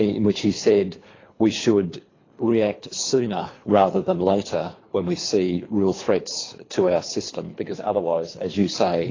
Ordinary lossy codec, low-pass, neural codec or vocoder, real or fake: AAC, 32 kbps; 7.2 kHz; codec, 24 kHz, 3 kbps, HILCodec; fake